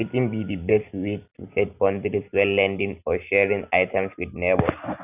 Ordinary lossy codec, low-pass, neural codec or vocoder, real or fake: none; 3.6 kHz; none; real